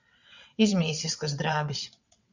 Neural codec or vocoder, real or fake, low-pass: vocoder, 22.05 kHz, 80 mel bands, WaveNeXt; fake; 7.2 kHz